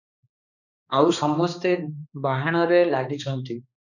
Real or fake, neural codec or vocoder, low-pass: fake; codec, 16 kHz, 2 kbps, X-Codec, HuBERT features, trained on balanced general audio; 7.2 kHz